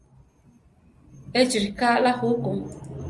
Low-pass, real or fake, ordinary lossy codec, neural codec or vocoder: 10.8 kHz; real; Opus, 32 kbps; none